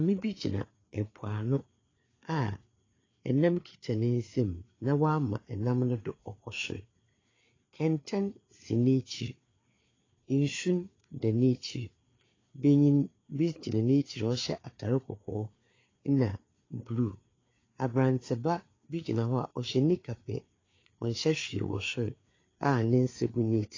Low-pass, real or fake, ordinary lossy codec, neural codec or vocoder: 7.2 kHz; fake; AAC, 32 kbps; codec, 16 kHz, 4 kbps, FreqCodec, larger model